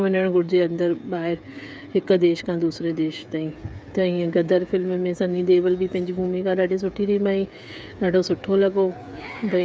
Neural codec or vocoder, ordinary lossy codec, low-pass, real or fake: codec, 16 kHz, 8 kbps, FreqCodec, smaller model; none; none; fake